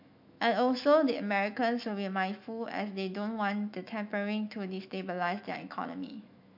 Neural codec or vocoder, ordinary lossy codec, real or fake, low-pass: autoencoder, 48 kHz, 128 numbers a frame, DAC-VAE, trained on Japanese speech; MP3, 48 kbps; fake; 5.4 kHz